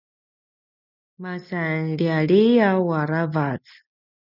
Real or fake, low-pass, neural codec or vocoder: real; 5.4 kHz; none